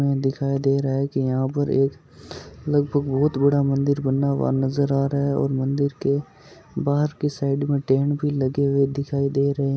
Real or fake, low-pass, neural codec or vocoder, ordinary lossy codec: real; none; none; none